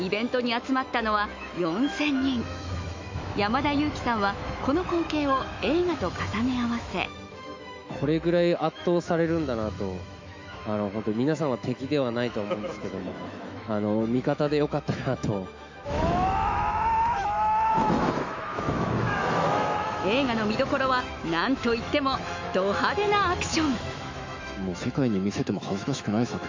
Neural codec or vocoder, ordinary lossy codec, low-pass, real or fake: autoencoder, 48 kHz, 128 numbers a frame, DAC-VAE, trained on Japanese speech; MP3, 48 kbps; 7.2 kHz; fake